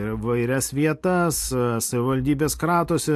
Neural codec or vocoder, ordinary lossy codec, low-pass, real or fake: none; AAC, 64 kbps; 14.4 kHz; real